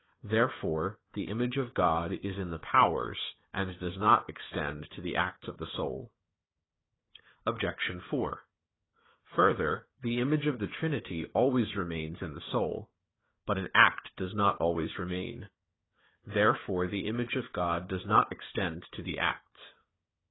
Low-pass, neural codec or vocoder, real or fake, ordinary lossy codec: 7.2 kHz; codec, 16 kHz, 4 kbps, FunCodec, trained on Chinese and English, 50 frames a second; fake; AAC, 16 kbps